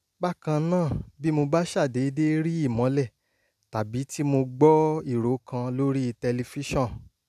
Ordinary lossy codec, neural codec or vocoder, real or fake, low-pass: none; none; real; 14.4 kHz